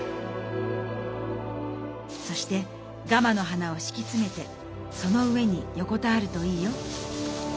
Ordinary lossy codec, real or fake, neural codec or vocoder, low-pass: none; real; none; none